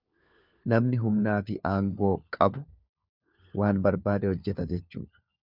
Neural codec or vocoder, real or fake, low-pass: codec, 16 kHz, 4 kbps, FunCodec, trained on LibriTTS, 50 frames a second; fake; 5.4 kHz